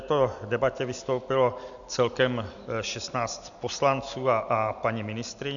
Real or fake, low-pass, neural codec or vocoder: real; 7.2 kHz; none